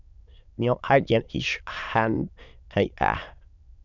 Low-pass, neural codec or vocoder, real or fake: 7.2 kHz; autoencoder, 22.05 kHz, a latent of 192 numbers a frame, VITS, trained on many speakers; fake